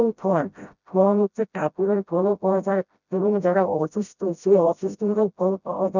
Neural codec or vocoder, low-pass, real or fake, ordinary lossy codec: codec, 16 kHz, 0.5 kbps, FreqCodec, smaller model; 7.2 kHz; fake; none